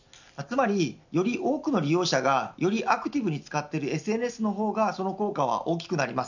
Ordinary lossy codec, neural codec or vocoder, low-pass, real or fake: none; none; 7.2 kHz; real